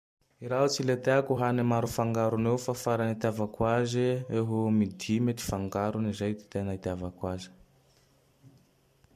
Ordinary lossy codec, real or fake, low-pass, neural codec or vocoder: MP3, 64 kbps; fake; 14.4 kHz; vocoder, 44.1 kHz, 128 mel bands every 256 samples, BigVGAN v2